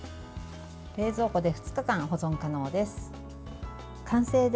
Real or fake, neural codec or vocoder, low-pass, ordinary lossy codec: real; none; none; none